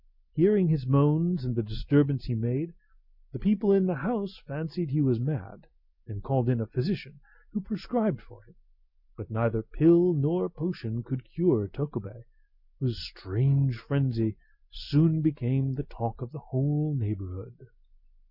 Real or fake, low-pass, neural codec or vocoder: real; 5.4 kHz; none